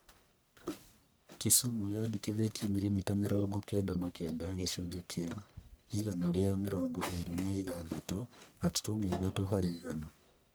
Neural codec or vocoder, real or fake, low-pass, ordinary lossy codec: codec, 44.1 kHz, 1.7 kbps, Pupu-Codec; fake; none; none